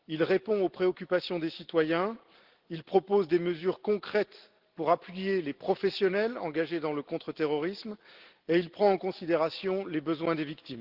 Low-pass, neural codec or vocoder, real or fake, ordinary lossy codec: 5.4 kHz; none; real; Opus, 16 kbps